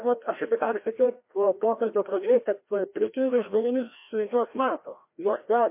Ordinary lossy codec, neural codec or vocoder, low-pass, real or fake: MP3, 24 kbps; codec, 16 kHz, 1 kbps, FreqCodec, larger model; 3.6 kHz; fake